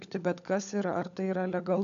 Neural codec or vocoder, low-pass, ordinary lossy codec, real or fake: codec, 16 kHz, 16 kbps, FunCodec, trained on LibriTTS, 50 frames a second; 7.2 kHz; AAC, 64 kbps; fake